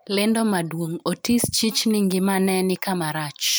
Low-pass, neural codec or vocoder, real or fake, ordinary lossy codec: none; vocoder, 44.1 kHz, 128 mel bands every 512 samples, BigVGAN v2; fake; none